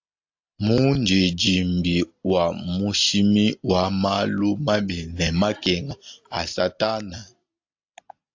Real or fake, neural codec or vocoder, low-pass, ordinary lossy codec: real; none; 7.2 kHz; AAC, 48 kbps